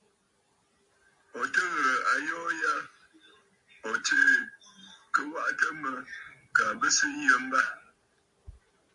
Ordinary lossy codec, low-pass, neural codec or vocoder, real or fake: MP3, 64 kbps; 10.8 kHz; none; real